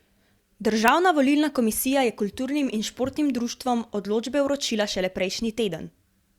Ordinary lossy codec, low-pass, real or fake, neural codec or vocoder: Opus, 64 kbps; 19.8 kHz; real; none